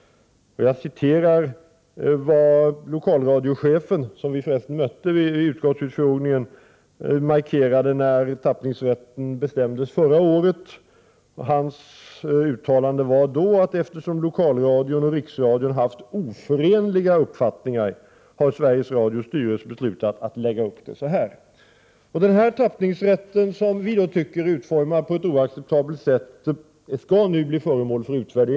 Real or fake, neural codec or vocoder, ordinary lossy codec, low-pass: real; none; none; none